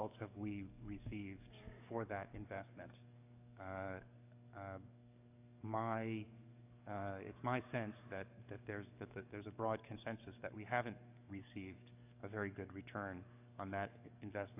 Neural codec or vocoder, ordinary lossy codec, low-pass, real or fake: codec, 44.1 kHz, 7.8 kbps, DAC; AAC, 32 kbps; 3.6 kHz; fake